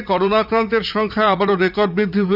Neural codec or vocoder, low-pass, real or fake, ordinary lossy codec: vocoder, 44.1 kHz, 80 mel bands, Vocos; 5.4 kHz; fake; none